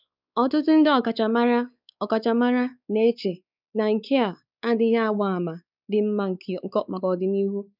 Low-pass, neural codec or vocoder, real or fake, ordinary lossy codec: 5.4 kHz; codec, 16 kHz, 4 kbps, X-Codec, WavLM features, trained on Multilingual LibriSpeech; fake; none